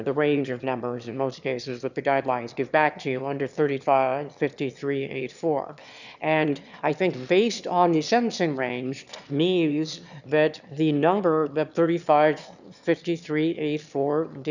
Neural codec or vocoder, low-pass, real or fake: autoencoder, 22.05 kHz, a latent of 192 numbers a frame, VITS, trained on one speaker; 7.2 kHz; fake